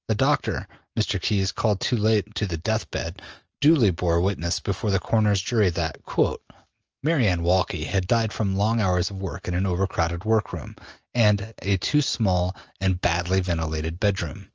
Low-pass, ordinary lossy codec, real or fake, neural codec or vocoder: 7.2 kHz; Opus, 24 kbps; real; none